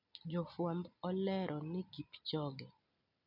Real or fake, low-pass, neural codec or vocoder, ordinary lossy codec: real; 5.4 kHz; none; none